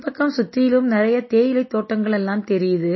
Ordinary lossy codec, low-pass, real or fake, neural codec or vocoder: MP3, 24 kbps; 7.2 kHz; real; none